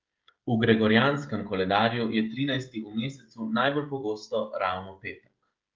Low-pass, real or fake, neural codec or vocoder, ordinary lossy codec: 7.2 kHz; fake; codec, 16 kHz, 16 kbps, FreqCodec, smaller model; Opus, 32 kbps